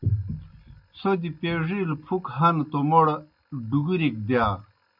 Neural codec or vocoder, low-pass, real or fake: none; 5.4 kHz; real